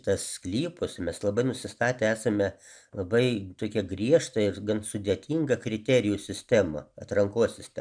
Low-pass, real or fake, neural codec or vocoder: 9.9 kHz; real; none